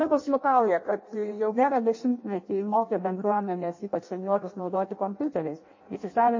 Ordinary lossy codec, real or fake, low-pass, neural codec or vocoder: MP3, 32 kbps; fake; 7.2 kHz; codec, 16 kHz in and 24 kHz out, 0.6 kbps, FireRedTTS-2 codec